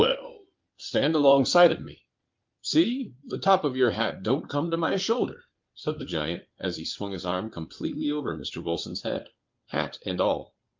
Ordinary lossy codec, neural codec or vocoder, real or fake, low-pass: Opus, 24 kbps; codec, 16 kHz in and 24 kHz out, 2.2 kbps, FireRedTTS-2 codec; fake; 7.2 kHz